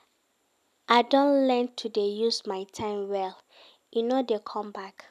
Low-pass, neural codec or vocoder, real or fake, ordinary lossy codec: 14.4 kHz; none; real; none